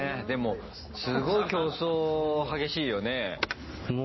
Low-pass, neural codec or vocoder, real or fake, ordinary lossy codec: 7.2 kHz; none; real; MP3, 24 kbps